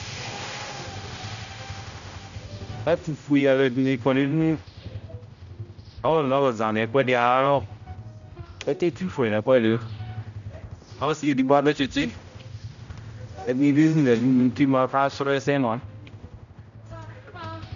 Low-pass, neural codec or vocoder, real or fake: 7.2 kHz; codec, 16 kHz, 0.5 kbps, X-Codec, HuBERT features, trained on general audio; fake